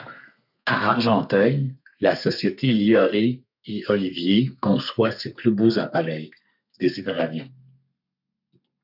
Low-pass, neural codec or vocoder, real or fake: 5.4 kHz; codec, 32 kHz, 1.9 kbps, SNAC; fake